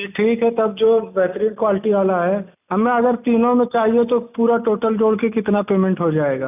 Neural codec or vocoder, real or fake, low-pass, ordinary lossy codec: none; real; 3.6 kHz; none